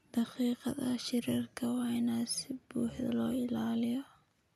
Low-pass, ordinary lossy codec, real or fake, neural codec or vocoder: 14.4 kHz; none; real; none